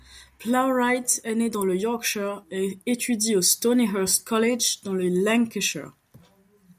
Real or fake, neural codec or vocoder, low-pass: real; none; 14.4 kHz